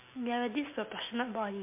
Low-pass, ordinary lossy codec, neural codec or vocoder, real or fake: 3.6 kHz; MP3, 24 kbps; none; real